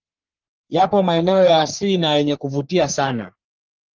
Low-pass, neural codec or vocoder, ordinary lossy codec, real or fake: 7.2 kHz; codec, 44.1 kHz, 3.4 kbps, Pupu-Codec; Opus, 32 kbps; fake